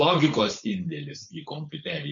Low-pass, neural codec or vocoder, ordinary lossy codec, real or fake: 7.2 kHz; codec, 16 kHz, 4.8 kbps, FACodec; MP3, 48 kbps; fake